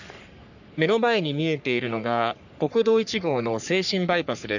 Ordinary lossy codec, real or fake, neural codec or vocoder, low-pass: none; fake; codec, 44.1 kHz, 3.4 kbps, Pupu-Codec; 7.2 kHz